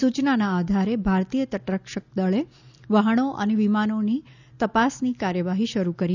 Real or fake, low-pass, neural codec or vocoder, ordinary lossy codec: real; 7.2 kHz; none; none